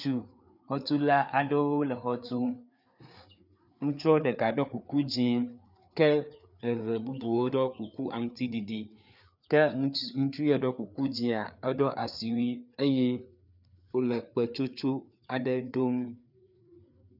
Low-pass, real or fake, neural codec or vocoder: 5.4 kHz; fake; codec, 16 kHz, 4 kbps, FreqCodec, larger model